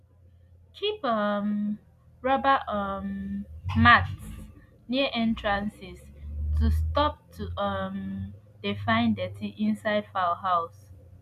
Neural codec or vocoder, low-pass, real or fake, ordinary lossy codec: none; 14.4 kHz; real; none